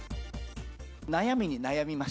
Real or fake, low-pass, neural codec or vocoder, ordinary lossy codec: real; none; none; none